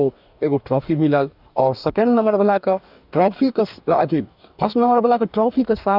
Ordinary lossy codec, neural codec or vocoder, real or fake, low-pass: none; codec, 44.1 kHz, 2.6 kbps, DAC; fake; 5.4 kHz